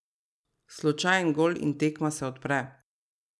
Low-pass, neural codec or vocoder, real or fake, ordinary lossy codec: none; none; real; none